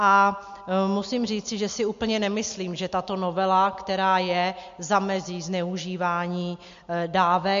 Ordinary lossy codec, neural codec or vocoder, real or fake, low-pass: MP3, 48 kbps; none; real; 7.2 kHz